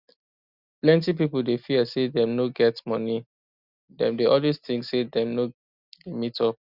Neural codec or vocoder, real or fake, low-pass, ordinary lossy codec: none; real; 5.4 kHz; Opus, 64 kbps